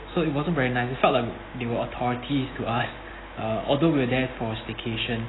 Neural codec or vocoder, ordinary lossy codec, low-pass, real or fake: none; AAC, 16 kbps; 7.2 kHz; real